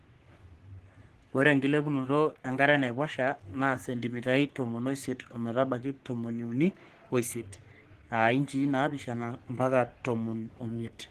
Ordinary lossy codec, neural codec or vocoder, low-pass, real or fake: Opus, 16 kbps; codec, 44.1 kHz, 3.4 kbps, Pupu-Codec; 14.4 kHz; fake